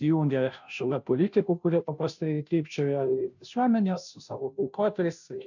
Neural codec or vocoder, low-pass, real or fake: codec, 16 kHz, 0.5 kbps, FunCodec, trained on Chinese and English, 25 frames a second; 7.2 kHz; fake